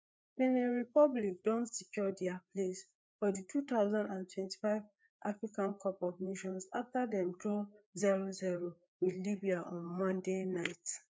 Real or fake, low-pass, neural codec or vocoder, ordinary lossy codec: fake; none; codec, 16 kHz, 4 kbps, FreqCodec, larger model; none